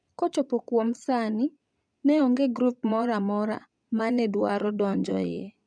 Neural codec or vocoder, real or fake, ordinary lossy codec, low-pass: vocoder, 22.05 kHz, 80 mel bands, Vocos; fake; none; 9.9 kHz